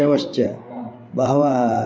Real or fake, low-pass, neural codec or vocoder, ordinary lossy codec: fake; none; codec, 16 kHz, 8 kbps, FreqCodec, larger model; none